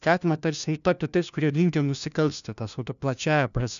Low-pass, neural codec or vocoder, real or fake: 7.2 kHz; codec, 16 kHz, 1 kbps, FunCodec, trained on LibriTTS, 50 frames a second; fake